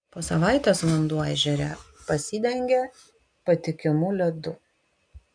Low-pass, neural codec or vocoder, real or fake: 9.9 kHz; none; real